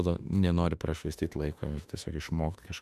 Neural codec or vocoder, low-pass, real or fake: autoencoder, 48 kHz, 32 numbers a frame, DAC-VAE, trained on Japanese speech; 14.4 kHz; fake